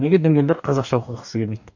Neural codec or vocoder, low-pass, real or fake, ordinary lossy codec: codec, 44.1 kHz, 2.6 kbps, DAC; 7.2 kHz; fake; none